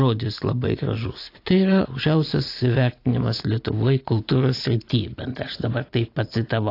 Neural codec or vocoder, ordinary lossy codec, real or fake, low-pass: none; AAC, 32 kbps; real; 5.4 kHz